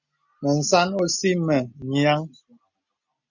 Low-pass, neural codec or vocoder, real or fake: 7.2 kHz; none; real